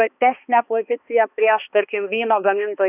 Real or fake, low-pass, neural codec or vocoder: fake; 3.6 kHz; codec, 16 kHz, 2 kbps, X-Codec, HuBERT features, trained on balanced general audio